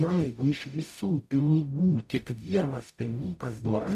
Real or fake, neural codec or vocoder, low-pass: fake; codec, 44.1 kHz, 0.9 kbps, DAC; 14.4 kHz